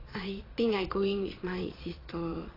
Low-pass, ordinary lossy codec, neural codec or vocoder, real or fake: 5.4 kHz; AAC, 24 kbps; vocoder, 22.05 kHz, 80 mel bands, Vocos; fake